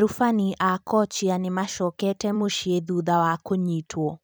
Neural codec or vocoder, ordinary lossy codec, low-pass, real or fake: vocoder, 44.1 kHz, 128 mel bands every 256 samples, BigVGAN v2; none; none; fake